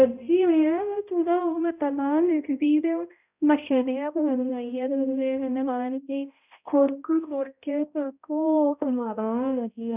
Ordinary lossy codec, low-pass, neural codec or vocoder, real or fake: none; 3.6 kHz; codec, 16 kHz, 0.5 kbps, X-Codec, HuBERT features, trained on balanced general audio; fake